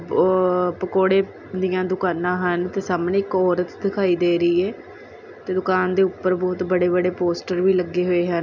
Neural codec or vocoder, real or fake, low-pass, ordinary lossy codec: none; real; 7.2 kHz; none